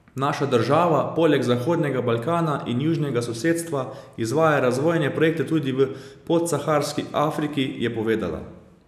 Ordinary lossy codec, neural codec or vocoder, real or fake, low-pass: none; none; real; 14.4 kHz